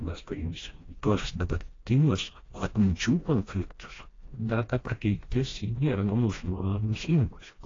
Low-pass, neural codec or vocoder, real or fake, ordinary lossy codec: 7.2 kHz; codec, 16 kHz, 1 kbps, FreqCodec, smaller model; fake; AAC, 32 kbps